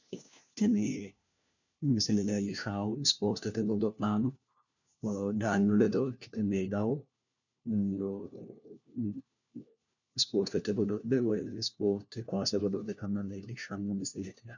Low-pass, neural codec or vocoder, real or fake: 7.2 kHz; codec, 16 kHz, 1 kbps, FunCodec, trained on LibriTTS, 50 frames a second; fake